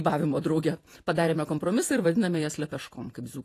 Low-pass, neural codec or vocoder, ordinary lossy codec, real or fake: 14.4 kHz; vocoder, 48 kHz, 128 mel bands, Vocos; AAC, 48 kbps; fake